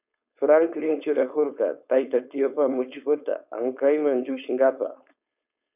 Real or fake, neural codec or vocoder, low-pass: fake; codec, 16 kHz, 4.8 kbps, FACodec; 3.6 kHz